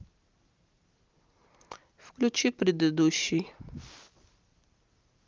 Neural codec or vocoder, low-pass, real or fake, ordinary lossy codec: none; 7.2 kHz; real; Opus, 32 kbps